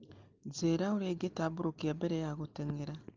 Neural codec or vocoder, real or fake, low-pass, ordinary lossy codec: none; real; 7.2 kHz; Opus, 24 kbps